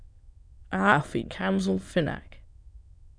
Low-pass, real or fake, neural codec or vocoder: 9.9 kHz; fake; autoencoder, 22.05 kHz, a latent of 192 numbers a frame, VITS, trained on many speakers